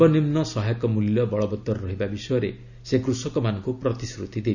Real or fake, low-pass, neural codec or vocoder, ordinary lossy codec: real; 7.2 kHz; none; none